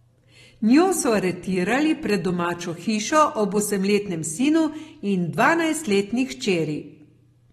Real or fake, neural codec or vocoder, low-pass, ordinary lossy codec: real; none; 19.8 kHz; AAC, 32 kbps